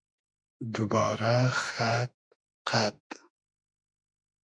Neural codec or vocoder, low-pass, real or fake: autoencoder, 48 kHz, 32 numbers a frame, DAC-VAE, trained on Japanese speech; 9.9 kHz; fake